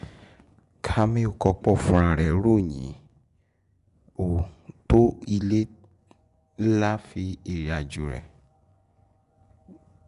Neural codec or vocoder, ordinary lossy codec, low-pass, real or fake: vocoder, 24 kHz, 100 mel bands, Vocos; none; 10.8 kHz; fake